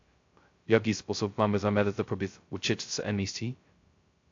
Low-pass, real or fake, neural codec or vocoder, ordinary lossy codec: 7.2 kHz; fake; codec, 16 kHz, 0.2 kbps, FocalCodec; AAC, 48 kbps